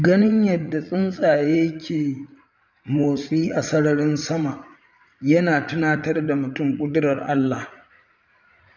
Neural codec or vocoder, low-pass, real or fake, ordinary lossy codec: vocoder, 44.1 kHz, 80 mel bands, Vocos; 7.2 kHz; fake; none